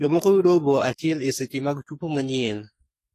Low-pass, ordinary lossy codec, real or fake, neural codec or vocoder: 14.4 kHz; AAC, 48 kbps; fake; codec, 44.1 kHz, 2.6 kbps, SNAC